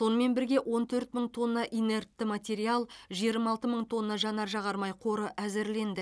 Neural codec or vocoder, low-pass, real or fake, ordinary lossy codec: none; none; real; none